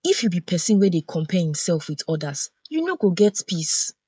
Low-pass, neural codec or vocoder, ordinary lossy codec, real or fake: none; codec, 16 kHz, 16 kbps, FreqCodec, smaller model; none; fake